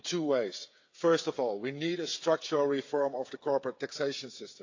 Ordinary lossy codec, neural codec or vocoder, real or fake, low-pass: AAC, 48 kbps; codec, 16 kHz, 8 kbps, FreqCodec, smaller model; fake; 7.2 kHz